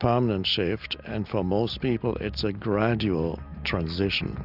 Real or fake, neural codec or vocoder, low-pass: real; none; 5.4 kHz